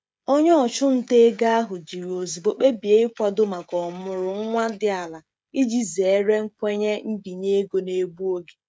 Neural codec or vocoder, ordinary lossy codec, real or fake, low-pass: codec, 16 kHz, 16 kbps, FreqCodec, smaller model; none; fake; none